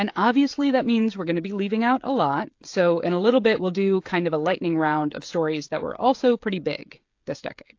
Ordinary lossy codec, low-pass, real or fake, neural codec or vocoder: AAC, 48 kbps; 7.2 kHz; fake; codec, 16 kHz, 16 kbps, FreqCodec, smaller model